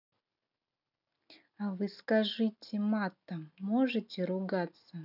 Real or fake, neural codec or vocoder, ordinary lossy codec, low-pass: real; none; none; 5.4 kHz